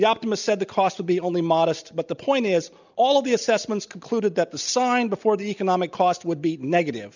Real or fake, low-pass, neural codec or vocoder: real; 7.2 kHz; none